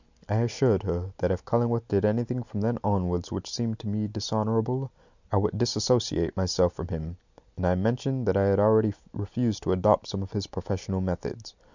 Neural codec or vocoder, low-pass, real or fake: none; 7.2 kHz; real